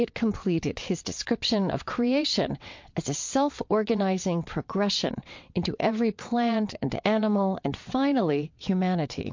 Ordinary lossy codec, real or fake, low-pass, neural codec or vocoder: MP3, 48 kbps; fake; 7.2 kHz; vocoder, 22.05 kHz, 80 mel bands, WaveNeXt